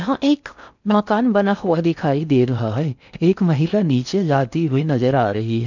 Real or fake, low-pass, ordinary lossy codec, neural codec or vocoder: fake; 7.2 kHz; none; codec, 16 kHz in and 24 kHz out, 0.6 kbps, FocalCodec, streaming, 4096 codes